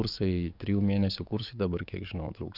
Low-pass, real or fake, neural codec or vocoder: 5.4 kHz; fake; codec, 44.1 kHz, 7.8 kbps, DAC